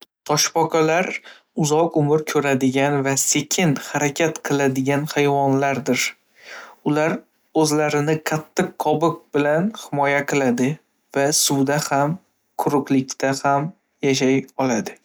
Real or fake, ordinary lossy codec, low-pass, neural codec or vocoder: real; none; none; none